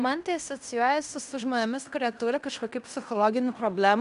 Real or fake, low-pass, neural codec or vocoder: fake; 10.8 kHz; codec, 16 kHz in and 24 kHz out, 0.9 kbps, LongCat-Audio-Codec, fine tuned four codebook decoder